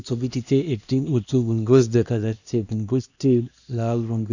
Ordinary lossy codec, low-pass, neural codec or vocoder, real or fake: none; 7.2 kHz; codec, 16 kHz, 1 kbps, X-Codec, HuBERT features, trained on LibriSpeech; fake